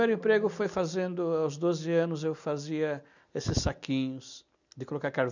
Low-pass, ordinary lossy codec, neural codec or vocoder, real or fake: 7.2 kHz; none; none; real